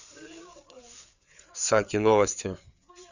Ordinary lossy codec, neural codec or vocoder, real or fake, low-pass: none; codec, 16 kHz, 4 kbps, FreqCodec, larger model; fake; 7.2 kHz